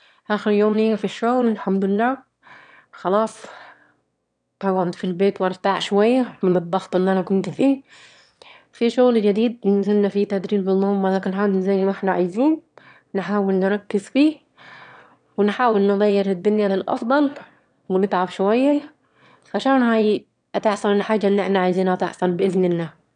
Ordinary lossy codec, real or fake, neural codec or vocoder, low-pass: none; fake; autoencoder, 22.05 kHz, a latent of 192 numbers a frame, VITS, trained on one speaker; 9.9 kHz